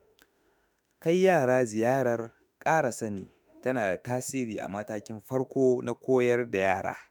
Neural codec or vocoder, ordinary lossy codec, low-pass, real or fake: autoencoder, 48 kHz, 32 numbers a frame, DAC-VAE, trained on Japanese speech; none; none; fake